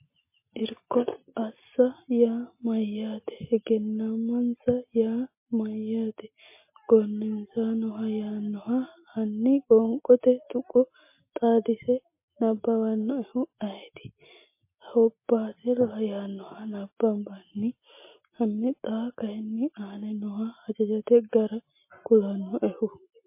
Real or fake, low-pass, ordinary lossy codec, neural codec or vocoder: real; 3.6 kHz; MP3, 24 kbps; none